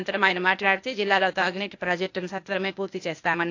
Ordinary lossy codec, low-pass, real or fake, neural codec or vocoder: AAC, 48 kbps; 7.2 kHz; fake; codec, 16 kHz, 0.8 kbps, ZipCodec